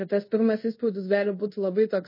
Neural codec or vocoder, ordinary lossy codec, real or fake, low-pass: codec, 24 kHz, 0.5 kbps, DualCodec; MP3, 24 kbps; fake; 5.4 kHz